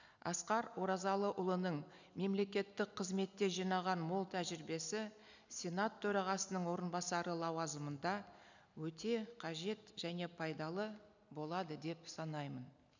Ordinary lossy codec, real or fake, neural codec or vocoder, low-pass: none; real; none; 7.2 kHz